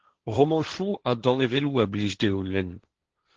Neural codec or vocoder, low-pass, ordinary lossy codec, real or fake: codec, 16 kHz, 1.1 kbps, Voila-Tokenizer; 7.2 kHz; Opus, 16 kbps; fake